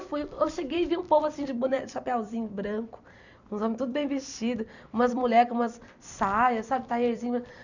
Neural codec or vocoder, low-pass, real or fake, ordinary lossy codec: vocoder, 44.1 kHz, 128 mel bands every 256 samples, BigVGAN v2; 7.2 kHz; fake; none